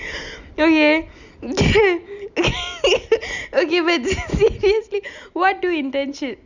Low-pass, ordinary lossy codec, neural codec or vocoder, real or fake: 7.2 kHz; none; none; real